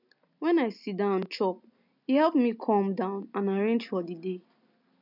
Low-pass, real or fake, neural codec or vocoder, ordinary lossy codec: 5.4 kHz; real; none; none